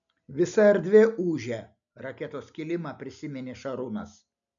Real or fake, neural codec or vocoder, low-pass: real; none; 7.2 kHz